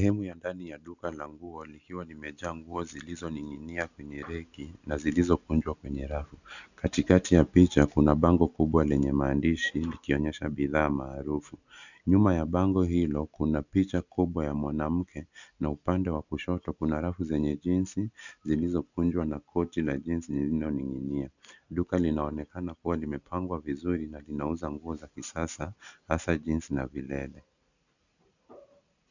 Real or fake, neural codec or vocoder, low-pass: real; none; 7.2 kHz